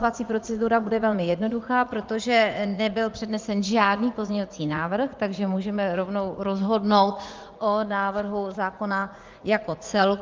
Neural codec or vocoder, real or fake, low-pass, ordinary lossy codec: vocoder, 44.1 kHz, 80 mel bands, Vocos; fake; 7.2 kHz; Opus, 32 kbps